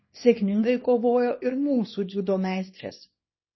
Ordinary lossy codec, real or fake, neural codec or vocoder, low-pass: MP3, 24 kbps; fake; codec, 24 kHz, 0.9 kbps, WavTokenizer, small release; 7.2 kHz